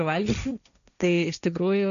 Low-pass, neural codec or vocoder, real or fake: 7.2 kHz; codec, 16 kHz, 1.1 kbps, Voila-Tokenizer; fake